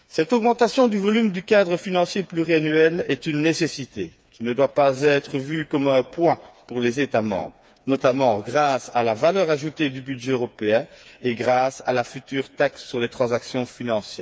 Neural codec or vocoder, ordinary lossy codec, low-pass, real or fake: codec, 16 kHz, 4 kbps, FreqCodec, smaller model; none; none; fake